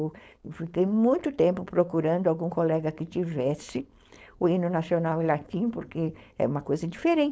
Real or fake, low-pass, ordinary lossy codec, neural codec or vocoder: fake; none; none; codec, 16 kHz, 4.8 kbps, FACodec